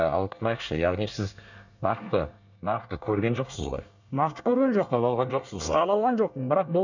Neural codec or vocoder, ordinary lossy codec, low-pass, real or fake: codec, 24 kHz, 1 kbps, SNAC; none; 7.2 kHz; fake